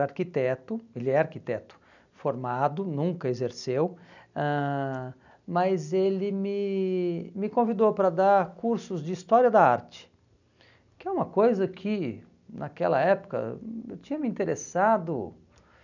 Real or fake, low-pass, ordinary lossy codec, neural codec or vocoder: real; 7.2 kHz; none; none